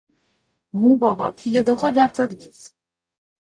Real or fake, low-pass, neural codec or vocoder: fake; 9.9 kHz; codec, 44.1 kHz, 0.9 kbps, DAC